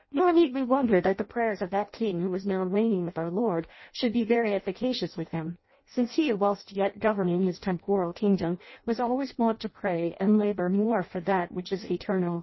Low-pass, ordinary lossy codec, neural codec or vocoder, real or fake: 7.2 kHz; MP3, 24 kbps; codec, 16 kHz in and 24 kHz out, 0.6 kbps, FireRedTTS-2 codec; fake